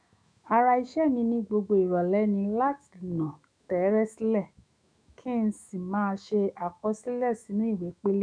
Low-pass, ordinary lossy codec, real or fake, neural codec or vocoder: 9.9 kHz; none; fake; autoencoder, 48 kHz, 128 numbers a frame, DAC-VAE, trained on Japanese speech